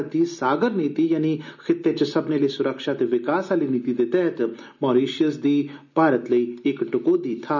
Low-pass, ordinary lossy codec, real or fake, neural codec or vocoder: 7.2 kHz; none; real; none